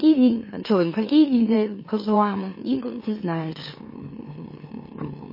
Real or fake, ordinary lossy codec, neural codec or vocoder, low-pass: fake; MP3, 24 kbps; autoencoder, 44.1 kHz, a latent of 192 numbers a frame, MeloTTS; 5.4 kHz